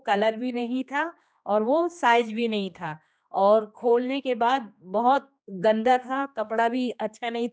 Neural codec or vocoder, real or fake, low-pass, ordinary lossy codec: codec, 16 kHz, 2 kbps, X-Codec, HuBERT features, trained on general audio; fake; none; none